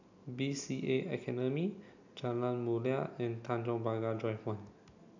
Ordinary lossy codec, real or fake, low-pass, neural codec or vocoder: none; real; 7.2 kHz; none